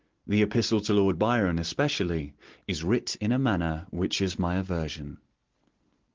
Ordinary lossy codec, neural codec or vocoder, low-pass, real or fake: Opus, 16 kbps; none; 7.2 kHz; real